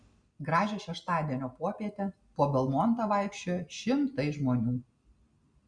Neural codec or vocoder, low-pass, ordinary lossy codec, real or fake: none; 9.9 kHz; Opus, 64 kbps; real